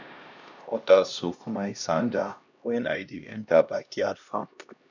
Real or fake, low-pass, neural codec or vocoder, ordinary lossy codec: fake; 7.2 kHz; codec, 16 kHz, 1 kbps, X-Codec, HuBERT features, trained on LibriSpeech; none